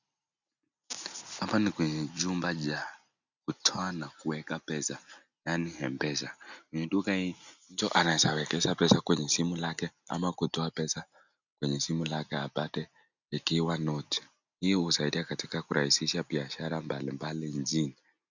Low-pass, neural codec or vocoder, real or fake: 7.2 kHz; none; real